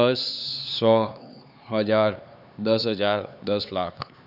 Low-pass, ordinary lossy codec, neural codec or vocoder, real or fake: 5.4 kHz; none; codec, 16 kHz, 4 kbps, X-Codec, HuBERT features, trained on LibriSpeech; fake